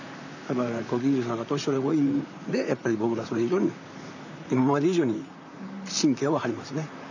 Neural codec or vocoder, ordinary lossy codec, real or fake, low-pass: vocoder, 44.1 kHz, 128 mel bands, Pupu-Vocoder; none; fake; 7.2 kHz